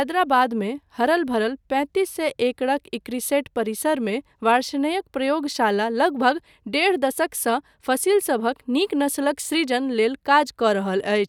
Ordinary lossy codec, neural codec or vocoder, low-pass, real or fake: none; none; 19.8 kHz; real